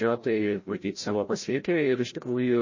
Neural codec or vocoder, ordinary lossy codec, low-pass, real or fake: codec, 16 kHz, 0.5 kbps, FreqCodec, larger model; MP3, 32 kbps; 7.2 kHz; fake